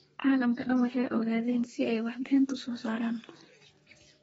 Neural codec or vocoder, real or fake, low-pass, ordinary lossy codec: codec, 32 kHz, 1.9 kbps, SNAC; fake; 14.4 kHz; AAC, 24 kbps